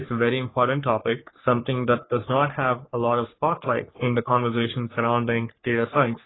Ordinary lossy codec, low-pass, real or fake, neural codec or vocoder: AAC, 16 kbps; 7.2 kHz; fake; codec, 44.1 kHz, 3.4 kbps, Pupu-Codec